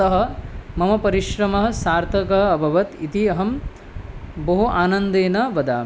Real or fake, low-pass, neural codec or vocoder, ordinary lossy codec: real; none; none; none